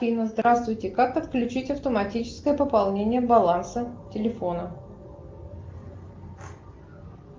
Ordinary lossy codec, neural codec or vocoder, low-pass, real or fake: Opus, 32 kbps; none; 7.2 kHz; real